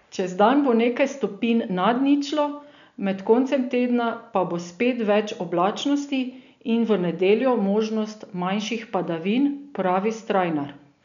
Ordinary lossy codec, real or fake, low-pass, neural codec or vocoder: none; real; 7.2 kHz; none